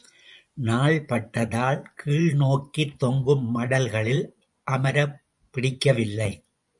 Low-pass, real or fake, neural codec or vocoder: 10.8 kHz; fake; vocoder, 24 kHz, 100 mel bands, Vocos